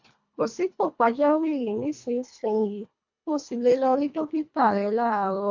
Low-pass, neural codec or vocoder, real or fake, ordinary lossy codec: 7.2 kHz; codec, 24 kHz, 1.5 kbps, HILCodec; fake; MP3, 48 kbps